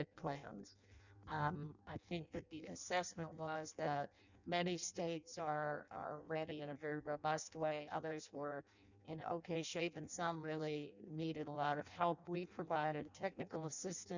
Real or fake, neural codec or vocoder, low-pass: fake; codec, 16 kHz in and 24 kHz out, 0.6 kbps, FireRedTTS-2 codec; 7.2 kHz